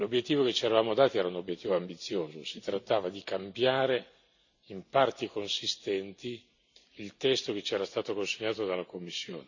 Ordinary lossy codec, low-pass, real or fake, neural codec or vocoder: none; 7.2 kHz; real; none